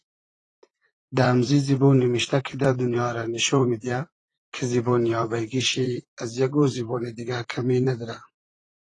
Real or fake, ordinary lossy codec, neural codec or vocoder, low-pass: fake; AAC, 32 kbps; vocoder, 44.1 kHz, 128 mel bands, Pupu-Vocoder; 10.8 kHz